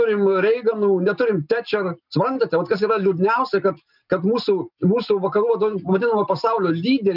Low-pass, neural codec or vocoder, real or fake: 5.4 kHz; none; real